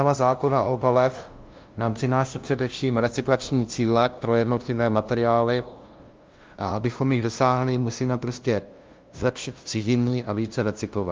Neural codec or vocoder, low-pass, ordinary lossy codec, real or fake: codec, 16 kHz, 0.5 kbps, FunCodec, trained on LibriTTS, 25 frames a second; 7.2 kHz; Opus, 32 kbps; fake